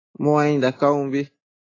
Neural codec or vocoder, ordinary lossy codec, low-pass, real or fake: none; AAC, 32 kbps; 7.2 kHz; real